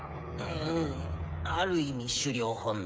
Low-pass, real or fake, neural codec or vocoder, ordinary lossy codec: none; fake; codec, 16 kHz, 8 kbps, FreqCodec, smaller model; none